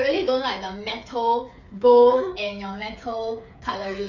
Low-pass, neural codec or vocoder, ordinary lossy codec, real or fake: 7.2 kHz; codec, 16 kHz, 8 kbps, FreqCodec, smaller model; none; fake